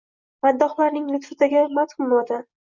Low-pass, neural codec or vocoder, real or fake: 7.2 kHz; none; real